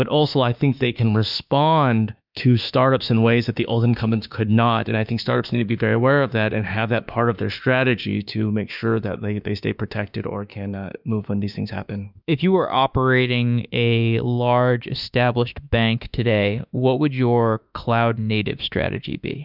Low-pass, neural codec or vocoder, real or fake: 5.4 kHz; autoencoder, 48 kHz, 32 numbers a frame, DAC-VAE, trained on Japanese speech; fake